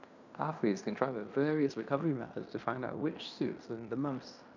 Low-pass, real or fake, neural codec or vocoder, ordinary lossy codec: 7.2 kHz; fake; codec, 16 kHz in and 24 kHz out, 0.9 kbps, LongCat-Audio-Codec, fine tuned four codebook decoder; none